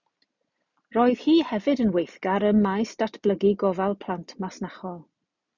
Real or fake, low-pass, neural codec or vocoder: real; 7.2 kHz; none